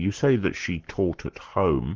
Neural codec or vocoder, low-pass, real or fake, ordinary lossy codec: codec, 44.1 kHz, 7.8 kbps, Pupu-Codec; 7.2 kHz; fake; Opus, 16 kbps